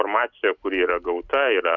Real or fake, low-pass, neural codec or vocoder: real; 7.2 kHz; none